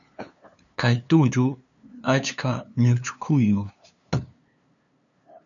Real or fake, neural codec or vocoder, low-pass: fake; codec, 16 kHz, 2 kbps, FunCodec, trained on LibriTTS, 25 frames a second; 7.2 kHz